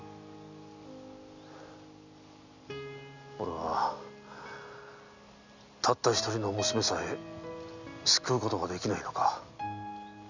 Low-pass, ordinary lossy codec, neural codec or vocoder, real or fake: 7.2 kHz; none; none; real